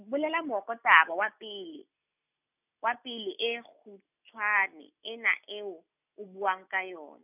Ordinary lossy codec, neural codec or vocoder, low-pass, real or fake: none; none; 3.6 kHz; real